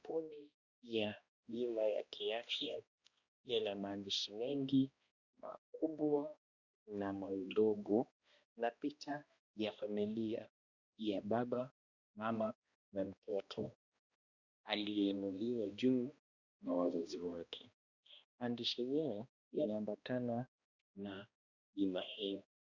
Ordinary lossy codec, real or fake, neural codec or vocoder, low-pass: Opus, 64 kbps; fake; codec, 16 kHz, 1 kbps, X-Codec, HuBERT features, trained on balanced general audio; 7.2 kHz